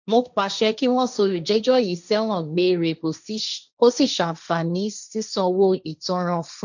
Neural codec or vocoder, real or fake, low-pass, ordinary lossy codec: codec, 16 kHz, 1.1 kbps, Voila-Tokenizer; fake; 7.2 kHz; none